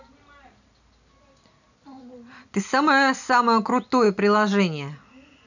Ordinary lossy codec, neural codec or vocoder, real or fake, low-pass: none; none; real; 7.2 kHz